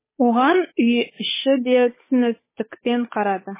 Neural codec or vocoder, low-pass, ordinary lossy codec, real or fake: codec, 16 kHz, 8 kbps, FunCodec, trained on Chinese and English, 25 frames a second; 3.6 kHz; MP3, 16 kbps; fake